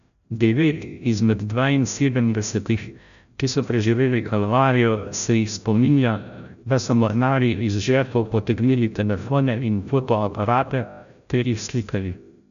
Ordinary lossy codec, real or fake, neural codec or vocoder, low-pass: none; fake; codec, 16 kHz, 0.5 kbps, FreqCodec, larger model; 7.2 kHz